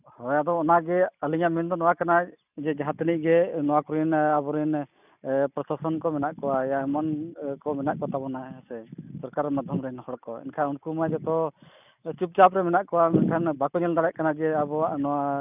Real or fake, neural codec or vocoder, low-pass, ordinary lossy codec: real; none; 3.6 kHz; none